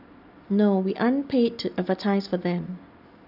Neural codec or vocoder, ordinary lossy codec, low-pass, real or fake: none; none; 5.4 kHz; real